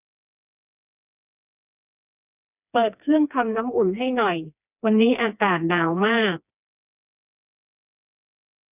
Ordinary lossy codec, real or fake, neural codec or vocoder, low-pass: none; fake; codec, 16 kHz, 2 kbps, FreqCodec, smaller model; 3.6 kHz